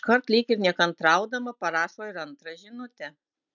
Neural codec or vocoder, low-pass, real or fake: none; 7.2 kHz; real